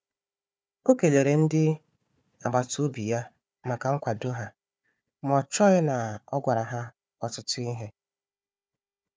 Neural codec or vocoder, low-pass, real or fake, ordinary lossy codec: codec, 16 kHz, 4 kbps, FunCodec, trained on Chinese and English, 50 frames a second; none; fake; none